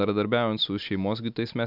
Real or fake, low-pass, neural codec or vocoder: real; 5.4 kHz; none